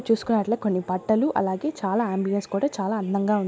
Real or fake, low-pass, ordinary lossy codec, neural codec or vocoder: real; none; none; none